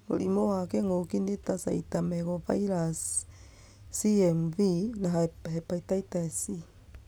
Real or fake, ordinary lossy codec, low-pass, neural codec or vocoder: real; none; none; none